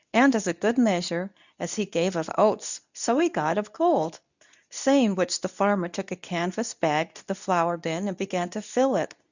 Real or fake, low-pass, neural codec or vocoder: fake; 7.2 kHz; codec, 24 kHz, 0.9 kbps, WavTokenizer, medium speech release version 2